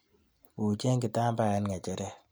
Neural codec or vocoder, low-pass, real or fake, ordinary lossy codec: none; none; real; none